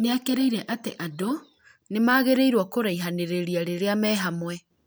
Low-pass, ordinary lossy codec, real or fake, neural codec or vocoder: none; none; real; none